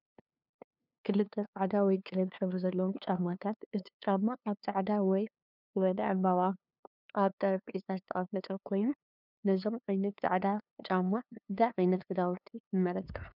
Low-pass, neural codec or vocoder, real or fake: 5.4 kHz; codec, 16 kHz, 2 kbps, FunCodec, trained on LibriTTS, 25 frames a second; fake